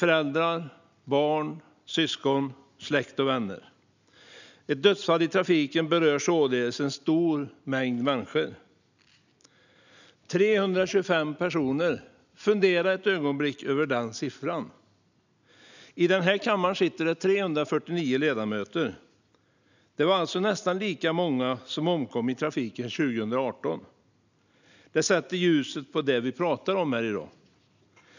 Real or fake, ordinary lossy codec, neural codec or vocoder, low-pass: real; none; none; 7.2 kHz